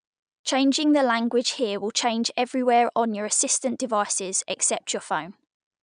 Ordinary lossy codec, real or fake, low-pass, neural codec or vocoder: none; real; 9.9 kHz; none